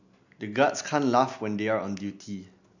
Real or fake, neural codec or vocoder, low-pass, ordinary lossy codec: real; none; 7.2 kHz; none